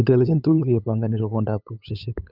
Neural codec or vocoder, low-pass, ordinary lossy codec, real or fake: codec, 16 kHz, 8 kbps, FunCodec, trained on LibriTTS, 25 frames a second; 5.4 kHz; none; fake